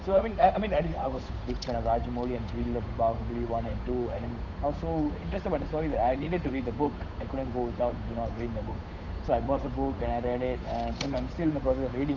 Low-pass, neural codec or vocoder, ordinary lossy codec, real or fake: 7.2 kHz; codec, 16 kHz, 8 kbps, FunCodec, trained on Chinese and English, 25 frames a second; none; fake